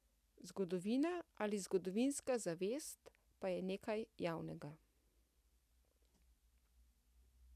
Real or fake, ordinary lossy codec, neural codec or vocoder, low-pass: real; none; none; 14.4 kHz